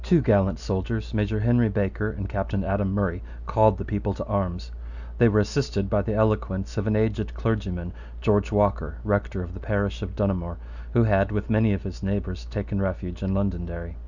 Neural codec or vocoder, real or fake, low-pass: none; real; 7.2 kHz